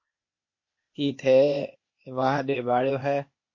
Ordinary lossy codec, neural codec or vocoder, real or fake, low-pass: MP3, 32 kbps; codec, 16 kHz, 0.8 kbps, ZipCodec; fake; 7.2 kHz